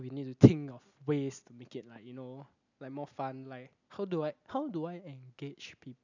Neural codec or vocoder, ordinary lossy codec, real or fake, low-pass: none; none; real; 7.2 kHz